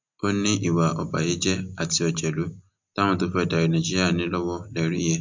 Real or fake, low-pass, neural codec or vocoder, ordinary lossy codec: real; 7.2 kHz; none; MP3, 64 kbps